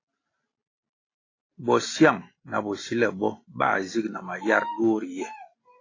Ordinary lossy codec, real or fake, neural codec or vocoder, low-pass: AAC, 32 kbps; real; none; 7.2 kHz